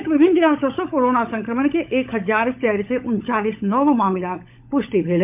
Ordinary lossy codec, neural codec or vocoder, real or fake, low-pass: MP3, 32 kbps; codec, 16 kHz, 16 kbps, FunCodec, trained on LibriTTS, 50 frames a second; fake; 3.6 kHz